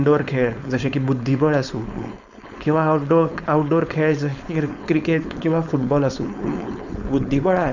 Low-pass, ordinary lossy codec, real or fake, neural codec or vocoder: 7.2 kHz; none; fake; codec, 16 kHz, 4.8 kbps, FACodec